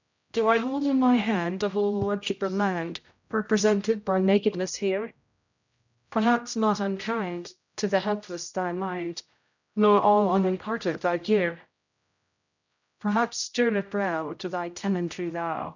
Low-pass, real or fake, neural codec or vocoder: 7.2 kHz; fake; codec, 16 kHz, 0.5 kbps, X-Codec, HuBERT features, trained on general audio